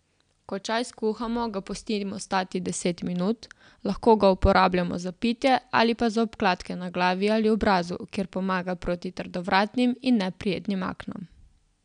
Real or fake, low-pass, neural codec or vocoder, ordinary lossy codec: real; 9.9 kHz; none; none